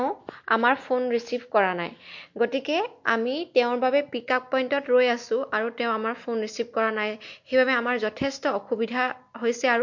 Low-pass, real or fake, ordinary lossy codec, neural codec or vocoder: 7.2 kHz; real; MP3, 48 kbps; none